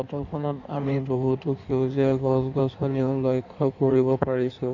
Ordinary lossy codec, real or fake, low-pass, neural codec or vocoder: none; fake; 7.2 kHz; codec, 16 kHz in and 24 kHz out, 1.1 kbps, FireRedTTS-2 codec